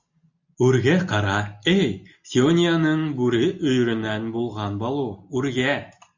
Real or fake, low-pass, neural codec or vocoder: real; 7.2 kHz; none